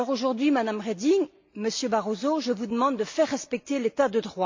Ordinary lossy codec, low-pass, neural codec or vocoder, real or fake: MP3, 48 kbps; 7.2 kHz; none; real